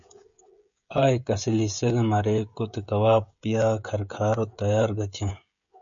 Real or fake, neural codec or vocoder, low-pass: fake; codec, 16 kHz, 16 kbps, FreqCodec, smaller model; 7.2 kHz